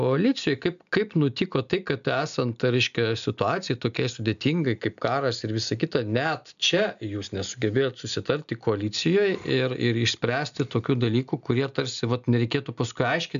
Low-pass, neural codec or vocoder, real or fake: 7.2 kHz; none; real